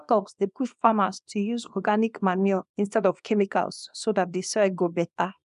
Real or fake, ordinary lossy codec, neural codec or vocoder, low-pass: fake; none; codec, 24 kHz, 0.9 kbps, WavTokenizer, small release; 10.8 kHz